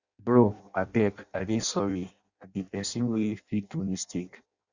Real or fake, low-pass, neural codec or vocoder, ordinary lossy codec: fake; 7.2 kHz; codec, 16 kHz in and 24 kHz out, 0.6 kbps, FireRedTTS-2 codec; Opus, 64 kbps